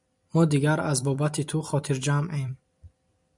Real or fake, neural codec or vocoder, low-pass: fake; vocoder, 44.1 kHz, 128 mel bands every 256 samples, BigVGAN v2; 10.8 kHz